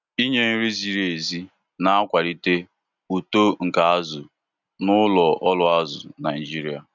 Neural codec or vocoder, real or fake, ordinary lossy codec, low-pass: none; real; none; 7.2 kHz